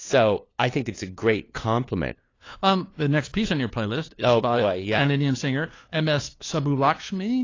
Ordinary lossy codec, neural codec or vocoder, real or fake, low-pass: AAC, 32 kbps; codec, 16 kHz, 2 kbps, FunCodec, trained on LibriTTS, 25 frames a second; fake; 7.2 kHz